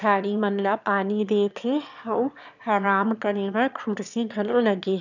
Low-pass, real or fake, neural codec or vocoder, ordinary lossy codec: 7.2 kHz; fake; autoencoder, 22.05 kHz, a latent of 192 numbers a frame, VITS, trained on one speaker; none